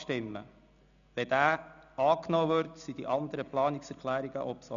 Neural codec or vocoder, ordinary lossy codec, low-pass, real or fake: none; none; 7.2 kHz; real